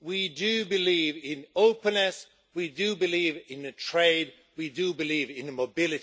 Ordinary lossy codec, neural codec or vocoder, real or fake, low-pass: none; none; real; none